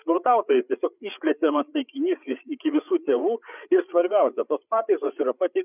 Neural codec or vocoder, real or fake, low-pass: codec, 16 kHz, 8 kbps, FreqCodec, larger model; fake; 3.6 kHz